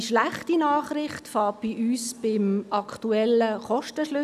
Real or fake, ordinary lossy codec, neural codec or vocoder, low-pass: real; none; none; 14.4 kHz